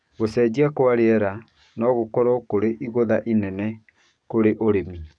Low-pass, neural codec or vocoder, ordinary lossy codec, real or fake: 9.9 kHz; codec, 44.1 kHz, 7.8 kbps, DAC; none; fake